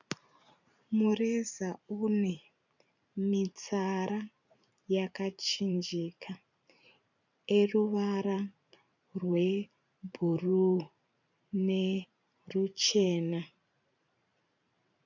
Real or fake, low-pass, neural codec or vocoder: fake; 7.2 kHz; vocoder, 44.1 kHz, 128 mel bands every 512 samples, BigVGAN v2